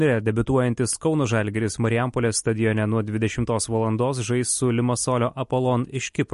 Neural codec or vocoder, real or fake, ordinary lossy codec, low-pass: none; real; MP3, 48 kbps; 14.4 kHz